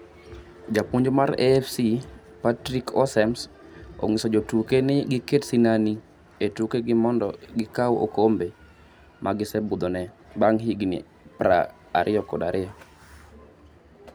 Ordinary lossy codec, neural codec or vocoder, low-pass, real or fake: none; none; none; real